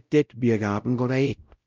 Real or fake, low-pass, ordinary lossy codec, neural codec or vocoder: fake; 7.2 kHz; Opus, 24 kbps; codec, 16 kHz, 0.5 kbps, X-Codec, WavLM features, trained on Multilingual LibriSpeech